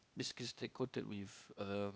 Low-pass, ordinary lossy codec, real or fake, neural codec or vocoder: none; none; fake; codec, 16 kHz, 0.8 kbps, ZipCodec